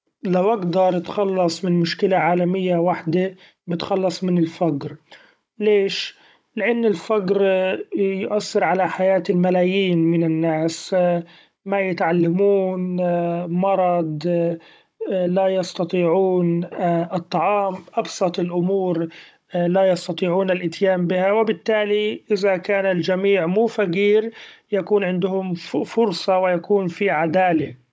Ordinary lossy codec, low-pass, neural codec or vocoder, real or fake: none; none; codec, 16 kHz, 16 kbps, FunCodec, trained on Chinese and English, 50 frames a second; fake